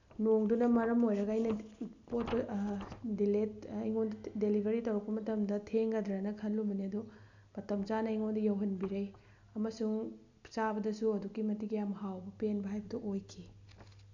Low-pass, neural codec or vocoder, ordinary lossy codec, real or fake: 7.2 kHz; none; none; real